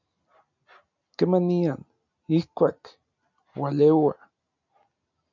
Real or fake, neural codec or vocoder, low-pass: real; none; 7.2 kHz